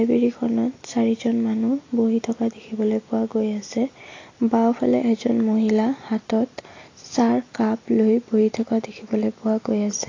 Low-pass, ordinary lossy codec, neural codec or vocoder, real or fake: 7.2 kHz; AAC, 32 kbps; none; real